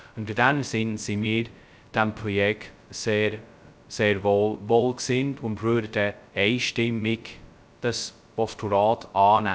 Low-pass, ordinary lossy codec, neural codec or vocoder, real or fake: none; none; codec, 16 kHz, 0.2 kbps, FocalCodec; fake